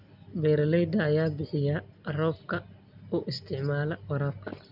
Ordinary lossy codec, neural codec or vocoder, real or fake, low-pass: none; none; real; 5.4 kHz